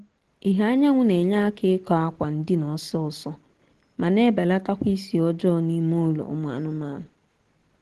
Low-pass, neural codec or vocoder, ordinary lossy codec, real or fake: 10.8 kHz; none; Opus, 16 kbps; real